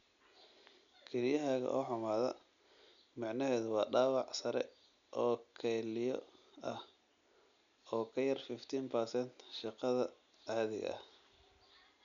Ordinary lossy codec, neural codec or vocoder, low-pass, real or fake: MP3, 96 kbps; none; 7.2 kHz; real